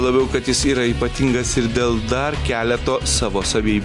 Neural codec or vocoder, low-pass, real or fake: none; 10.8 kHz; real